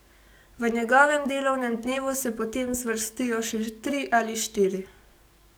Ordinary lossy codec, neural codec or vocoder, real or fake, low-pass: none; codec, 44.1 kHz, 7.8 kbps, DAC; fake; none